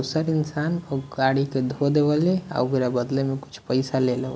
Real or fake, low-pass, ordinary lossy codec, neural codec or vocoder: real; none; none; none